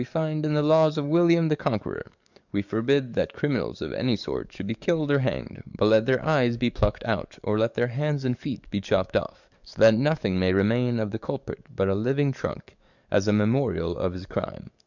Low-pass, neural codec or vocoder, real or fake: 7.2 kHz; codec, 44.1 kHz, 7.8 kbps, DAC; fake